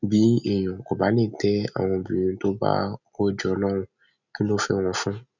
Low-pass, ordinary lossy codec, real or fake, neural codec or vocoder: 7.2 kHz; none; real; none